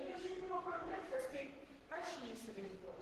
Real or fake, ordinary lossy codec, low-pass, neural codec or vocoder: fake; Opus, 16 kbps; 14.4 kHz; codec, 44.1 kHz, 3.4 kbps, Pupu-Codec